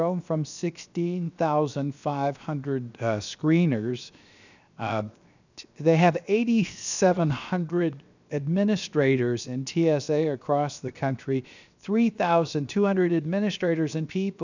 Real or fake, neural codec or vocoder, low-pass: fake; codec, 16 kHz, 0.7 kbps, FocalCodec; 7.2 kHz